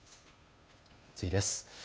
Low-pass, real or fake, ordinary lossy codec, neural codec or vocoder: none; fake; none; codec, 16 kHz, 2 kbps, FunCodec, trained on Chinese and English, 25 frames a second